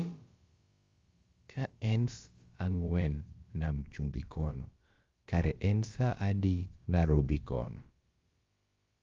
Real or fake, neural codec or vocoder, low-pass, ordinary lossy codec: fake; codec, 16 kHz, about 1 kbps, DyCAST, with the encoder's durations; 7.2 kHz; Opus, 32 kbps